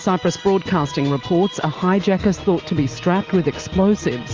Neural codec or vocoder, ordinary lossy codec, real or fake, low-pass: none; Opus, 32 kbps; real; 7.2 kHz